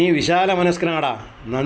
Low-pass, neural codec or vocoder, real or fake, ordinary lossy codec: none; none; real; none